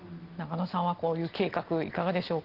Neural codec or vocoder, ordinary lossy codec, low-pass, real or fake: none; Opus, 16 kbps; 5.4 kHz; real